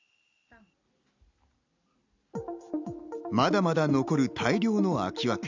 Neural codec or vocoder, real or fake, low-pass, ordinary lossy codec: none; real; 7.2 kHz; none